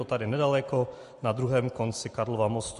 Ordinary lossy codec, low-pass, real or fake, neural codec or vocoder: MP3, 48 kbps; 14.4 kHz; real; none